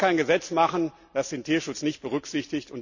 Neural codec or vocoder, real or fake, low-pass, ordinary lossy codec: none; real; 7.2 kHz; none